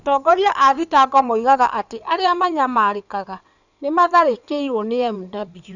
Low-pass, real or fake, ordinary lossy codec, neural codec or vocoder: 7.2 kHz; fake; none; codec, 16 kHz in and 24 kHz out, 2.2 kbps, FireRedTTS-2 codec